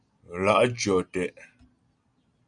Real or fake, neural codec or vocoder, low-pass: real; none; 9.9 kHz